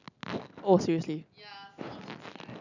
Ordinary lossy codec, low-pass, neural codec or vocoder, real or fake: none; 7.2 kHz; none; real